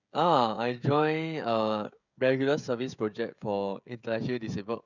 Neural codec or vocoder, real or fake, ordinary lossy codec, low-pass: codec, 16 kHz, 16 kbps, FreqCodec, smaller model; fake; none; 7.2 kHz